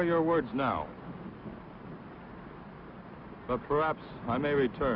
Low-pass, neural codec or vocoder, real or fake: 5.4 kHz; none; real